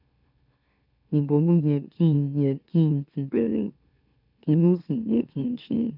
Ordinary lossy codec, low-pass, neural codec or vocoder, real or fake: none; 5.4 kHz; autoencoder, 44.1 kHz, a latent of 192 numbers a frame, MeloTTS; fake